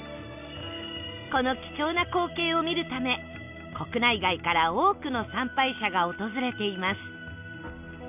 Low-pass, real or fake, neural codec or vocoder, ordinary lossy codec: 3.6 kHz; real; none; none